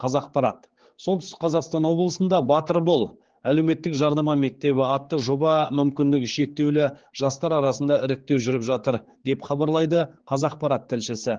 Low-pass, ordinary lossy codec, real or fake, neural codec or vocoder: 7.2 kHz; Opus, 16 kbps; fake; codec, 16 kHz, 4 kbps, X-Codec, HuBERT features, trained on general audio